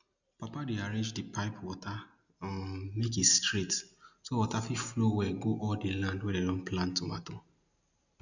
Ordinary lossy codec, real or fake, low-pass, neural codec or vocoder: none; real; 7.2 kHz; none